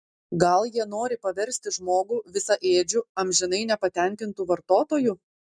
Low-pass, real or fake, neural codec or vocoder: 9.9 kHz; real; none